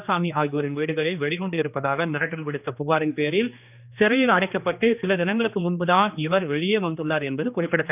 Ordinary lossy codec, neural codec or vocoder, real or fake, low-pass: none; codec, 16 kHz, 2 kbps, X-Codec, HuBERT features, trained on general audio; fake; 3.6 kHz